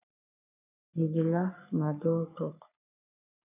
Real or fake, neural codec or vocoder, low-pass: fake; codec, 44.1 kHz, 3.4 kbps, Pupu-Codec; 3.6 kHz